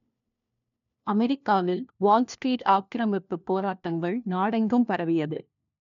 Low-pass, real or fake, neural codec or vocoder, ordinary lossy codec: 7.2 kHz; fake; codec, 16 kHz, 1 kbps, FunCodec, trained on LibriTTS, 50 frames a second; none